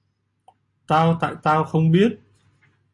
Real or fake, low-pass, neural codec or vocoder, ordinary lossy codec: real; 10.8 kHz; none; AAC, 64 kbps